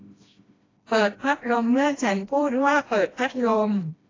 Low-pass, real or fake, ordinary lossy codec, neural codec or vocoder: 7.2 kHz; fake; AAC, 32 kbps; codec, 16 kHz, 1 kbps, FreqCodec, smaller model